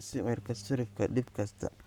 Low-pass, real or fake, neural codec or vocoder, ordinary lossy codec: 19.8 kHz; fake; codec, 44.1 kHz, 7.8 kbps, Pupu-Codec; none